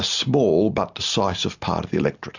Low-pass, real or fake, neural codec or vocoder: 7.2 kHz; real; none